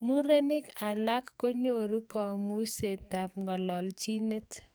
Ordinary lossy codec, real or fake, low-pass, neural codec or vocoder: none; fake; none; codec, 44.1 kHz, 2.6 kbps, SNAC